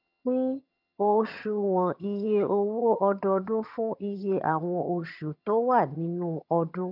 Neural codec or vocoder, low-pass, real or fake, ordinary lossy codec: vocoder, 22.05 kHz, 80 mel bands, HiFi-GAN; 5.4 kHz; fake; none